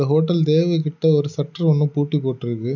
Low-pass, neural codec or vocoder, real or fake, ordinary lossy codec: 7.2 kHz; none; real; none